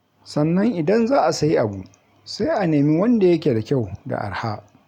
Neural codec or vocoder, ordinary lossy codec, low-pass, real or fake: none; none; 19.8 kHz; real